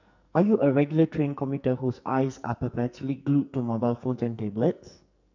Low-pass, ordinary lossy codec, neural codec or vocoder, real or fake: 7.2 kHz; none; codec, 44.1 kHz, 2.6 kbps, SNAC; fake